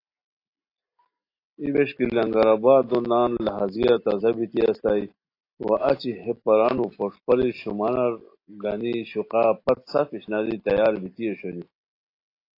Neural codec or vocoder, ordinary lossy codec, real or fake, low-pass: none; AAC, 32 kbps; real; 5.4 kHz